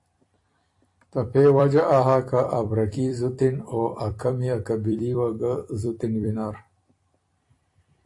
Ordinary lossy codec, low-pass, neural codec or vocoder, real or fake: AAC, 48 kbps; 10.8 kHz; vocoder, 44.1 kHz, 128 mel bands every 256 samples, BigVGAN v2; fake